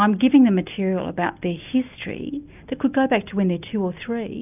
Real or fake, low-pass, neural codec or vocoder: real; 3.6 kHz; none